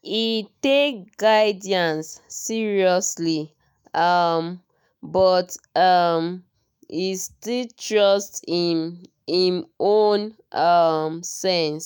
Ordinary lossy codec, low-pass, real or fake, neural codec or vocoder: none; none; fake; autoencoder, 48 kHz, 128 numbers a frame, DAC-VAE, trained on Japanese speech